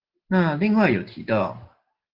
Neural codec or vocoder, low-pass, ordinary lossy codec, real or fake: none; 5.4 kHz; Opus, 16 kbps; real